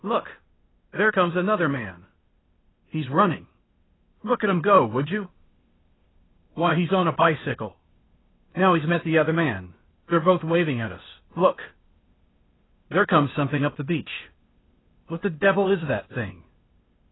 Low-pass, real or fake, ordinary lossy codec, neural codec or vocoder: 7.2 kHz; fake; AAC, 16 kbps; codec, 16 kHz, 0.8 kbps, ZipCodec